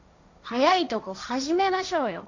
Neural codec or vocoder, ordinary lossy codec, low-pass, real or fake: codec, 16 kHz, 1.1 kbps, Voila-Tokenizer; MP3, 64 kbps; 7.2 kHz; fake